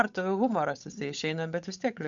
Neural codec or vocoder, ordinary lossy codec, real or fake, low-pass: codec, 16 kHz, 8 kbps, FunCodec, trained on Chinese and English, 25 frames a second; MP3, 64 kbps; fake; 7.2 kHz